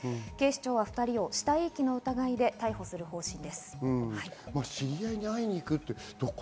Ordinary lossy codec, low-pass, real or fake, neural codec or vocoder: none; none; real; none